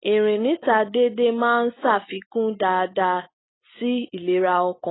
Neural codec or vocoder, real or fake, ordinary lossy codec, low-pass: none; real; AAC, 16 kbps; 7.2 kHz